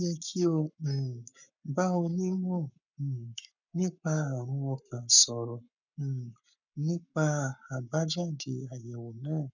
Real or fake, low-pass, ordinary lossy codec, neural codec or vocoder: fake; 7.2 kHz; none; codec, 44.1 kHz, 7.8 kbps, Pupu-Codec